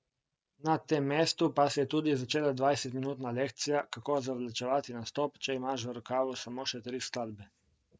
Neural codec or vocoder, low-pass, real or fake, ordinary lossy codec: none; none; real; none